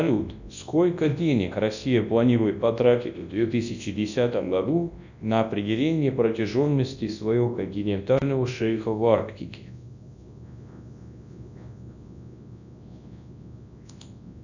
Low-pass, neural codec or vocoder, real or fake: 7.2 kHz; codec, 24 kHz, 0.9 kbps, WavTokenizer, large speech release; fake